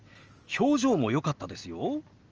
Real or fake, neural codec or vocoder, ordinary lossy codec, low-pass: real; none; Opus, 24 kbps; 7.2 kHz